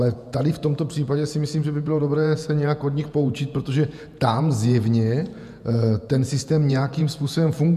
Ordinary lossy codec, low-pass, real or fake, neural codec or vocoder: MP3, 96 kbps; 14.4 kHz; fake; vocoder, 48 kHz, 128 mel bands, Vocos